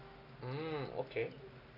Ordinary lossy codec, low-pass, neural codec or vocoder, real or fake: none; 5.4 kHz; none; real